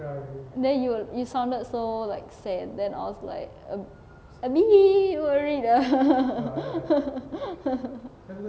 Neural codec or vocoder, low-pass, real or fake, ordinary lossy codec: none; none; real; none